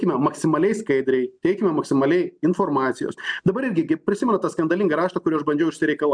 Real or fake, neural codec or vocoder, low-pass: real; none; 9.9 kHz